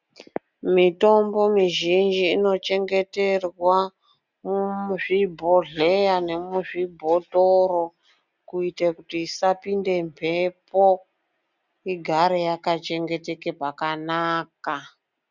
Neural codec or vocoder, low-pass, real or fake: none; 7.2 kHz; real